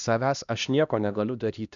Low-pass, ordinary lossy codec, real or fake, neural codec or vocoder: 7.2 kHz; MP3, 96 kbps; fake; codec, 16 kHz, 1 kbps, X-Codec, HuBERT features, trained on LibriSpeech